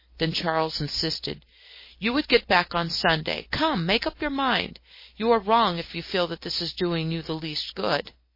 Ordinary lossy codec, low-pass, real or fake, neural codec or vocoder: MP3, 24 kbps; 5.4 kHz; real; none